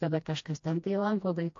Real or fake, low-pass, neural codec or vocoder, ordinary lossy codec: fake; 7.2 kHz; codec, 16 kHz, 1 kbps, FreqCodec, smaller model; MP3, 48 kbps